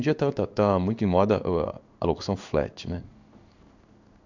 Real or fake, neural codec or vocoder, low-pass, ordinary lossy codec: fake; codec, 16 kHz in and 24 kHz out, 1 kbps, XY-Tokenizer; 7.2 kHz; none